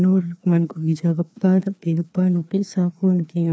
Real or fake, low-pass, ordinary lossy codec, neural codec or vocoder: fake; none; none; codec, 16 kHz, 2 kbps, FreqCodec, larger model